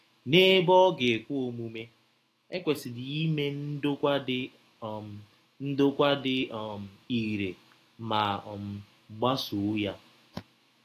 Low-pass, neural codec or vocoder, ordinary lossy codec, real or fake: 14.4 kHz; autoencoder, 48 kHz, 128 numbers a frame, DAC-VAE, trained on Japanese speech; AAC, 48 kbps; fake